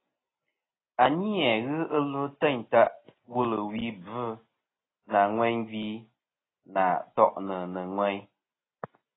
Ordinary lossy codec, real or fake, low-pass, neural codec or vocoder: AAC, 16 kbps; real; 7.2 kHz; none